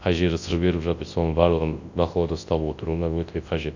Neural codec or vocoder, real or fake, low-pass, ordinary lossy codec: codec, 24 kHz, 0.9 kbps, WavTokenizer, large speech release; fake; 7.2 kHz; AAC, 48 kbps